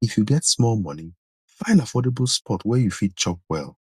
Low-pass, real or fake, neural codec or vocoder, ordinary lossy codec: 14.4 kHz; real; none; Opus, 64 kbps